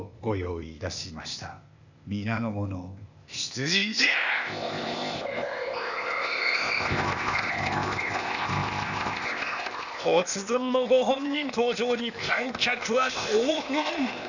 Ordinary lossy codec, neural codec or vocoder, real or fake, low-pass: none; codec, 16 kHz, 0.8 kbps, ZipCodec; fake; 7.2 kHz